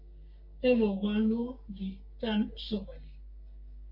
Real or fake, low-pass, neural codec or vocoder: fake; 5.4 kHz; codec, 44.1 kHz, 3.4 kbps, Pupu-Codec